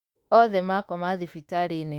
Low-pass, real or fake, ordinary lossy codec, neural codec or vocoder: 19.8 kHz; fake; Opus, 64 kbps; autoencoder, 48 kHz, 32 numbers a frame, DAC-VAE, trained on Japanese speech